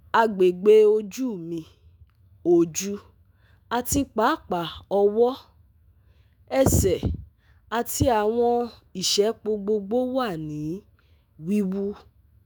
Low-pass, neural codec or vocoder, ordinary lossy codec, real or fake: none; autoencoder, 48 kHz, 128 numbers a frame, DAC-VAE, trained on Japanese speech; none; fake